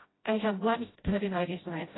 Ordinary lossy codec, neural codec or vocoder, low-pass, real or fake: AAC, 16 kbps; codec, 16 kHz, 0.5 kbps, FreqCodec, smaller model; 7.2 kHz; fake